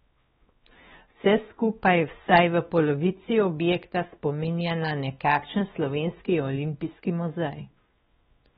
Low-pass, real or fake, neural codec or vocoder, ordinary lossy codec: 7.2 kHz; fake; codec, 16 kHz, 2 kbps, X-Codec, WavLM features, trained on Multilingual LibriSpeech; AAC, 16 kbps